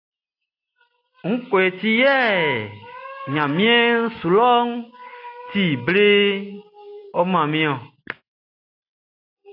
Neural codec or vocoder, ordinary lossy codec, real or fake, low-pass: none; AAC, 32 kbps; real; 5.4 kHz